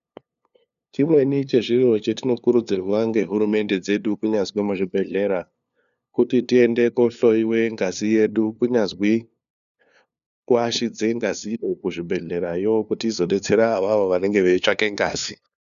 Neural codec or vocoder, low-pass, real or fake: codec, 16 kHz, 2 kbps, FunCodec, trained on LibriTTS, 25 frames a second; 7.2 kHz; fake